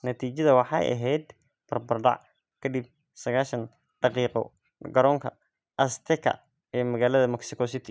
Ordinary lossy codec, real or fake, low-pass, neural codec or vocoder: none; real; none; none